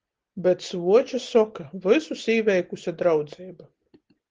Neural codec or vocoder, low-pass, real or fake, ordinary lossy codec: none; 7.2 kHz; real; Opus, 16 kbps